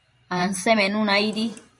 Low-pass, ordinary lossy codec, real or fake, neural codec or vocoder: 10.8 kHz; MP3, 64 kbps; fake; vocoder, 44.1 kHz, 128 mel bands every 512 samples, BigVGAN v2